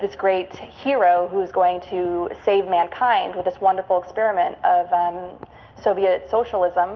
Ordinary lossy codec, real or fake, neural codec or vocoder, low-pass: Opus, 32 kbps; real; none; 7.2 kHz